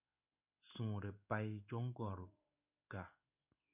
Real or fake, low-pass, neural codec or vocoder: fake; 3.6 kHz; codec, 16 kHz in and 24 kHz out, 1 kbps, XY-Tokenizer